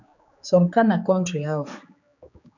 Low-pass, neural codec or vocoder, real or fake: 7.2 kHz; codec, 16 kHz, 4 kbps, X-Codec, HuBERT features, trained on general audio; fake